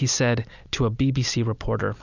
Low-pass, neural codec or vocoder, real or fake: 7.2 kHz; none; real